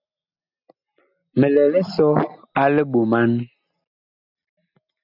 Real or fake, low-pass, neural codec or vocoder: real; 5.4 kHz; none